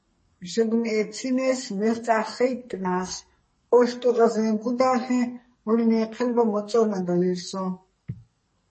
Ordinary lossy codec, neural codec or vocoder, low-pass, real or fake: MP3, 32 kbps; codec, 44.1 kHz, 2.6 kbps, SNAC; 10.8 kHz; fake